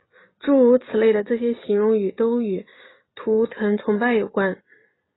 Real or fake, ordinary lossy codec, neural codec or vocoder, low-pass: fake; AAC, 16 kbps; vocoder, 44.1 kHz, 128 mel bands every 256 samples, BigVGAN v2; 7.2 kHz